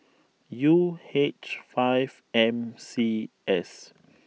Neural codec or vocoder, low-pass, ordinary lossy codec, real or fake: none; none; none; real